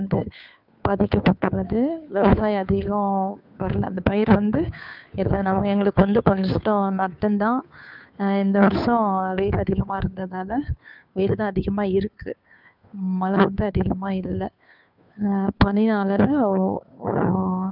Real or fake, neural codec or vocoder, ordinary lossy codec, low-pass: fake; codec, 16 kHz, 2 kbps, FunCodec, trained on Chinese and English, 25 frames a second; none; 5.4 kHz